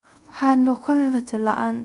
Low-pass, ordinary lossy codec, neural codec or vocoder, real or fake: 10.8 kHz; none; codec, 24 kHz, 0.5 kbps, DualCodec; fake